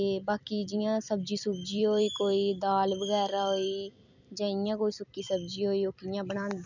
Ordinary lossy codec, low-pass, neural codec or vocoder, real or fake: none; 7.2 kHz; vocoder, 44.1 kHz, 128 mel bands every 256 samples, BigVGAN v2; fake